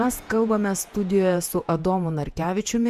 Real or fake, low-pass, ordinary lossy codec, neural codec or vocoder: fake; 14.4 kHz; Opus, 64 kbps; vocoder, 48 kHz, 128 mel bands, Vocos